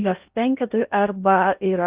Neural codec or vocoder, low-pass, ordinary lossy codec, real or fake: codec, 16 kHz in and 24 kHz out, 0.6 kbps, FocalCodec, streaming, 2048 codes; 3.6 kHz; Opus, 32 kbps; fake